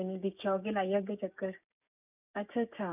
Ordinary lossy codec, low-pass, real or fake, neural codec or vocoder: none; 3.6 kHz; fake; codec, 16 kHz, 6 kbps, DAC